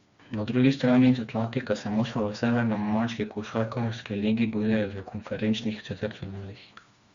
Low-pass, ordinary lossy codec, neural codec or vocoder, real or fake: 7.2 kHz; Opus, 64 kbps; codec, 16 kHz, 2 kbps, FreqCodec, smaller model; fake